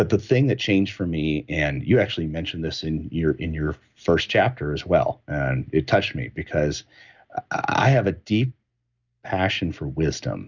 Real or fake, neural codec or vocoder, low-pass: real; none; 7.2 kHz